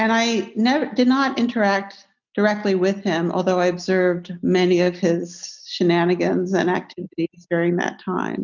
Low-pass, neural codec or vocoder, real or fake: 7.2 kHz; none; real